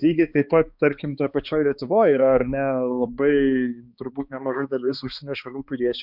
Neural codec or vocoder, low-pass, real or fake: codec, 16 kHz, 2 kbps, X-Codec, HuBERT features, trained on balanced general audio; 5.4 kHz; fake